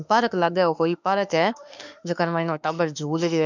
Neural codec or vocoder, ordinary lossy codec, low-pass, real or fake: autoencoder, 48 kHz, 32 numbers a frame, DAC-VAE, trained on Japanese speech; none; 7.2 kHz; fake